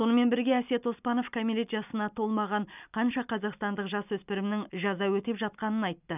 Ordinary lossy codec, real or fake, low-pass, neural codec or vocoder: none; real; 3.6 kHz; none